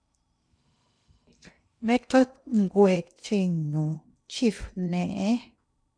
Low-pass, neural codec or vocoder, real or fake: 9.9 kHz; codec, 16 kHz in and 24 kHz out, 0.6 kbps, FocalCodec, streaming, 2048 codes; fake